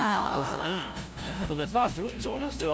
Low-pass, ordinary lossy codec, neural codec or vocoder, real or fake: none; none; codec, 16 kHz, 0.5 kbps, FunCodec, trained on LibriTTS, 25 frames a second; fake